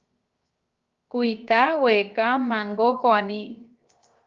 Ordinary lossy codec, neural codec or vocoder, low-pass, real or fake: Opus, 32 kbps; codec, 16 kHz, 0.7 kbps, FocalCodec; 7.2 kHz; fake